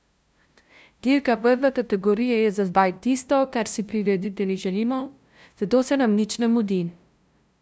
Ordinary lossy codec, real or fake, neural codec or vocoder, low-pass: none; fake; codec, 16 kHz, 0.5 kbps, FunCodec, trained on LibriTTS, 25 frames a second; none